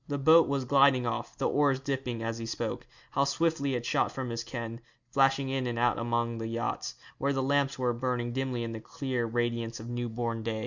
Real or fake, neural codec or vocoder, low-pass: real; none; 7.2 kHz